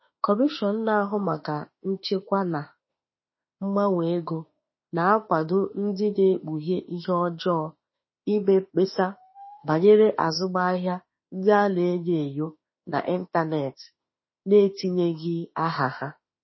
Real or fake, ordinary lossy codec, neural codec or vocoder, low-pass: fake; MP3, 24 kbps; autoencoder, 48 kHz, 32 numbers a frame, DAC-VAE, trained on Japanese speech; 7.2 kHz